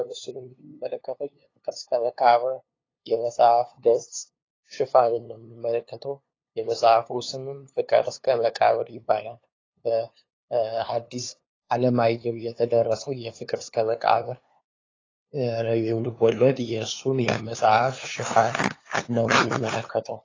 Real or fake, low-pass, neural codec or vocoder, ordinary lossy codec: fake; 7.2 kHz; codec, 16 kHz, 2 kbps, FunCodec, trained on LibriTTS, 25 frames a second; AAC, 32 kbps